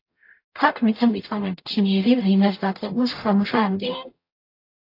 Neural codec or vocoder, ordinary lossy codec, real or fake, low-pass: codec, 44.1 kHz, 0.9 kbps, DAC; AAC, 32 kbps; fake; 5.4 kHz